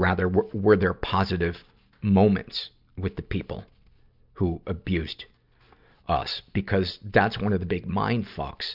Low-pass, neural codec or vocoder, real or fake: 5.4 kHz; none; real